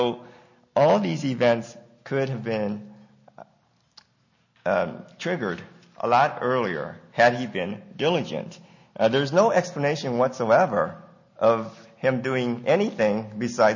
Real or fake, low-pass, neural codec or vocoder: real; 7.2 kHz; none